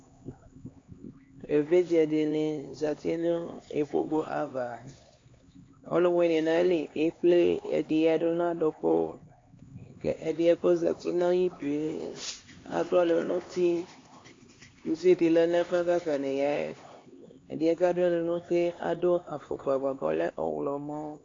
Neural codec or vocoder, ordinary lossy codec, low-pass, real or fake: codec, 16 kHz, 2 kbps, X-Codec, HuBERT features, trained on LibriSpeech; AAC, 32 kbps; 7.2 kHz; fake